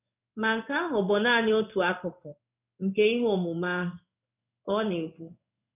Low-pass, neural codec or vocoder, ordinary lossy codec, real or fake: 3.6 kHz; codec, 16 kHz in and 24 kHz out, 1 kbps, XY-Tokenizer; none; fake